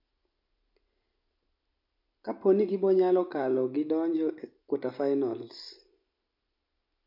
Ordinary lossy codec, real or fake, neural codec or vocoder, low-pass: MP3, 48 kbps; real; none; 5.4 kHz